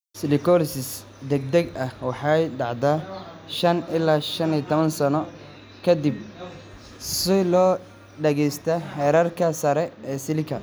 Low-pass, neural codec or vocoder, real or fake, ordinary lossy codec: none; none; real; none